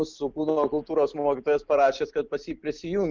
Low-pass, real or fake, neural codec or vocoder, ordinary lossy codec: 7.2 kHz; real; none; Opus, 24 kbps